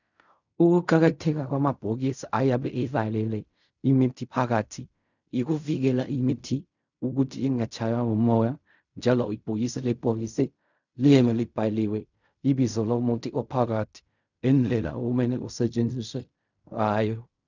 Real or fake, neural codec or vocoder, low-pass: fake; codec, 16 kHz in and 24 kHz out, 0.4 kbps, LongCat-Audio-Codec, fine tuned four codebook decoder; 7.2 kHz